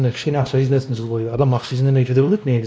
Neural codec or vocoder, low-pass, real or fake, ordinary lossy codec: codec, 16 kHz, 1 kbps, X-Codec, WavLM features, trained on Multilingual LibriSpeech; none; fake; none